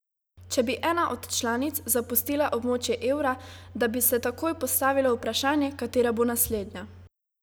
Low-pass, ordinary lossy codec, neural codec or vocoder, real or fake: none; none; none; real